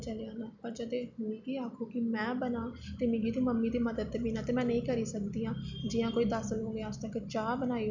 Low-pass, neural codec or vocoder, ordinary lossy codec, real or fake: 7.2 kHz; none; none; real